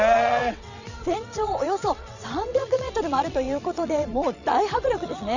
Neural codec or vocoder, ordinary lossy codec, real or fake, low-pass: vocoder, 22.05 kHz, 80 mel bands, WaveNeXt; none; fake; 7.2 kHz